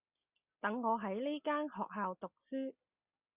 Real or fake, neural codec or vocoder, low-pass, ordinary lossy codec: real; none; 3.6 kHz; Opus, 64 kbps